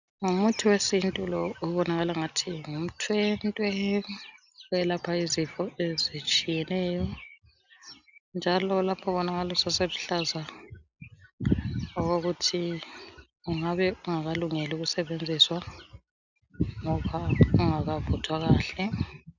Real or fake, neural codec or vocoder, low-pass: real; none; 7.2 kHz